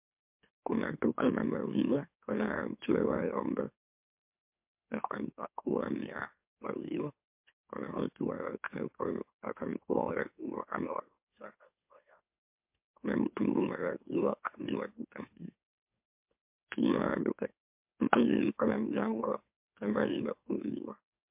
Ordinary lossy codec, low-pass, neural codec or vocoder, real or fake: MP3, 32 kbps; 3.6 kHz; autoencoder, 44.1 kHz, a latent of 192 numbers a frame, MeloTTS; fake